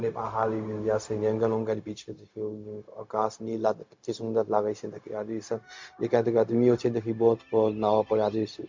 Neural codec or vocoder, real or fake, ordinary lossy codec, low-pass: codec, 16 kHz, 0.4 kbps, LongCat-Audio-Codec; fake; MP3, 48 kbps; 7.2 kHz